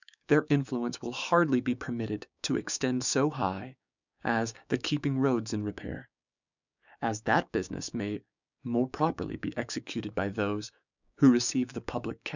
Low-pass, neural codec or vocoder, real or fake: 7.2 kHz; codec, 16 kHz, 6 kbps, DAC; fake